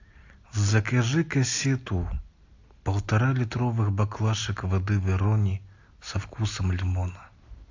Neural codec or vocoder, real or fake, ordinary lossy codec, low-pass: none; real; AAC, 48 kbps; 7.2 kHz